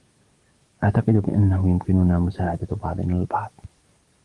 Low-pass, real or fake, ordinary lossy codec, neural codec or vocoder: 10.8 kHz; fake; Opus, 24 kbps; codec, 44.1 kHz, 7.8 kbps, DAC